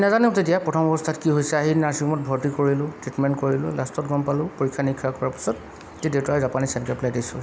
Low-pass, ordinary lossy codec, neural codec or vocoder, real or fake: none; none; none; real